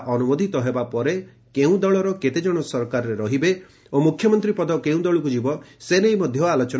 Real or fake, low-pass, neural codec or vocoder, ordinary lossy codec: real; none; none; none